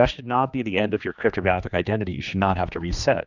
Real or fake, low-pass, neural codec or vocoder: fake; 7.2 kHz; codec, 16 kHz, 2 kbps, X-Codec, HuBERT features, trained on general audio